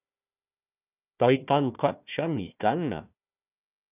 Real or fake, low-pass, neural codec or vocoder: fake; 3.6 kHz; codec, 16 kHz, 1 kbps, FunCodec, trained on Chinese and English, 50 frames a second